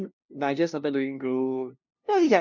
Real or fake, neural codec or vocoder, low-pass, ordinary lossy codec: fake; codec, 16 kHz, 0.5 kbps, FunCodec, trained on LibriTTS, 25 frames a second; 7.2 kHz; none